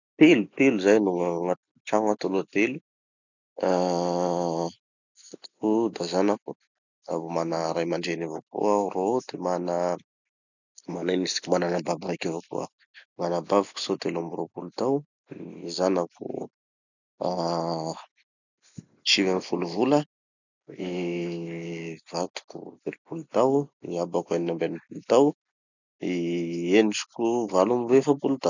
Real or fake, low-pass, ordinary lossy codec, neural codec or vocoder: real; 7.2 kHz; none; none